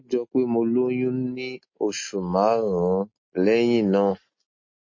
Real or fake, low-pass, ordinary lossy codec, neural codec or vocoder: real; 7.2 kHz; MP3, 32 kbps; none